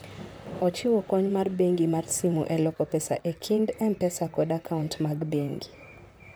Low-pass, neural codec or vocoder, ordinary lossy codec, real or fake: none; vocoder, 44.1 kHz, 128 mel bands, Pupu-Vocoder; none; fake